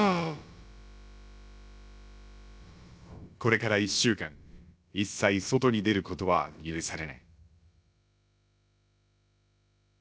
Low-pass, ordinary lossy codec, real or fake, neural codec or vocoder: none; none; fake; codec, 16 kHz, about 1 kbps, DyCAST, with the encoder's durations